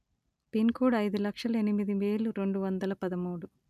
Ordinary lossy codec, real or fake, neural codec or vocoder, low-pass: none; real; none; 14.4 kHz